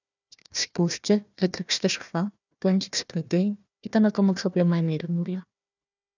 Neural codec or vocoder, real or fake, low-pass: codec, 16 kHz, 1 kbps, FunCodec, trained on Chinese and English, 50 frames a second; fake; 7.2 kHz